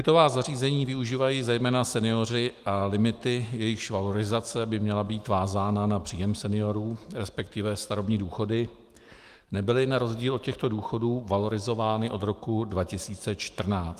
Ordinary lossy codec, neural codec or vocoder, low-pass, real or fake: Opus, 24 kbps; autoencoder, 48 kHz, 128 numbers a frame, DAC-VAE, trained on Japanese speech; 14.4 kHz; fake